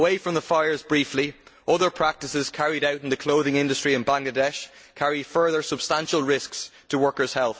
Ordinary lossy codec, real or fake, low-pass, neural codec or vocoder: none; real; none; none